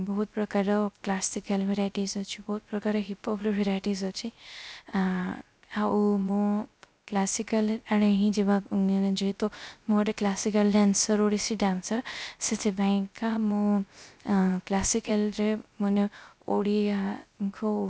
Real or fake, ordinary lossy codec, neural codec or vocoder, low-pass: fake; none; codec, 16 kHz, 0.3 kbps, FocalCodec; none